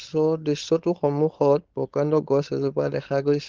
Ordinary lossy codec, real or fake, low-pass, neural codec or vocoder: Opus, 16 kbps; fake; 7.2 kHz; codec, 16 kHz, 4.8 kbps, FACodec